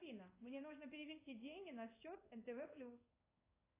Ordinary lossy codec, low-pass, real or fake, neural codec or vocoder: AAC, 24 kbps; 3.6 kHz; fake; codec, 16 kHz in and 24 kHz out, 1 kbps, XY-Tokenizer